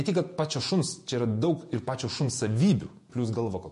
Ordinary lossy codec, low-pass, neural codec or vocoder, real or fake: MP3, 48 kbps; 14.4 kHz; vocoder, 48 kHz, 128 mel bands, Vocos; fake